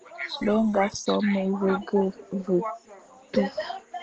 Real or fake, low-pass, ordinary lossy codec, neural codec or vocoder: real; 7.2 kHz; Opus, 16 kbps; none